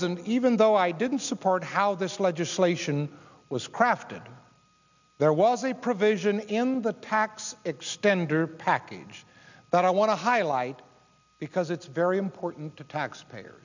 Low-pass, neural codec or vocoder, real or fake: 7.2 kHz; none; real